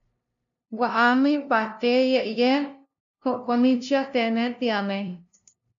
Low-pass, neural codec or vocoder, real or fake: 7.2 kHz; codec, 16 kHz, 0.5 kbps, FunCodec, trained on LibriTTS, 25 frames a second; fake